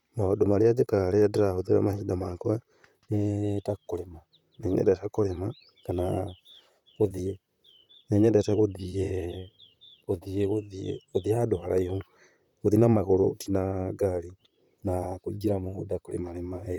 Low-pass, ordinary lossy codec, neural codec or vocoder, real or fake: 19.8 kHz; none; vocoder, 44.1 kHz, 128 mel bands, Pupu-Vocoder; fake